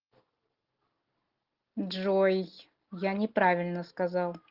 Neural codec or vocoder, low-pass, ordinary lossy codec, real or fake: none; 5.4 kHz; Opus, 16 kbps; real